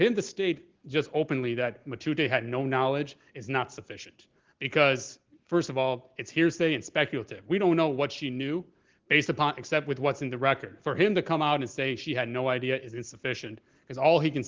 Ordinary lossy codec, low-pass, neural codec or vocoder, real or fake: Opus, 16 kbps; 7.2 kHz; none; real